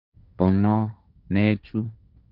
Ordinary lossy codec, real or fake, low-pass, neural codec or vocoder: none; fake; 5.4 kHz; codec, 16 kHz, 1.1 kbps, Voila-Tokenizer